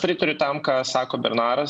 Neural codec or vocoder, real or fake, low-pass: none; real; 9.9 kHz